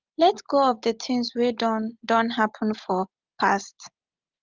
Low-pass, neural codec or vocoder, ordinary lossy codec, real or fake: 7.2 kHz; none; Opus, 32 kbps; real